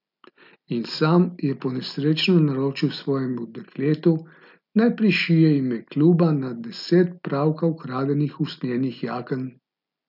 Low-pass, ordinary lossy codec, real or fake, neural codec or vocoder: 5.4 kHz; none; real; none